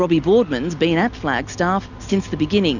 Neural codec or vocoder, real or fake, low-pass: none; real; 7.2 kHz